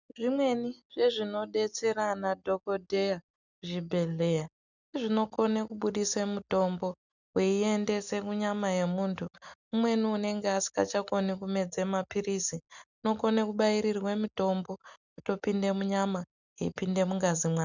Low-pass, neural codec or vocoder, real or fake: 7.2 kHz; none; real